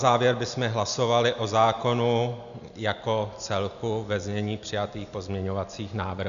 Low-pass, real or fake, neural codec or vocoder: 7.2 kHz; real; none